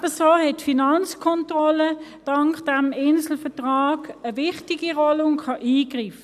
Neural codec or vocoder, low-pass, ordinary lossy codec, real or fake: none; 14.4 kHz; none; real